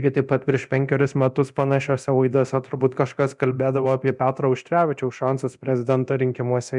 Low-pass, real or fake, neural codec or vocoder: 10.8 kHz; fake; codec, 24 kHz, 0.9 kbps, DualCodec